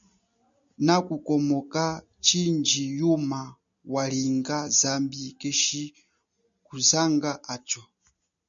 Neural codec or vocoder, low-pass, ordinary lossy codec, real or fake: none; 7.2 kHz; MP3, 96 kbps; real